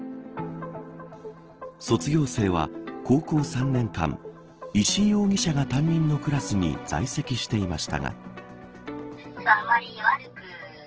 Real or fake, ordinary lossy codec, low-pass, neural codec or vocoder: real; Opus, 16 kbps; 7.2 kHz; none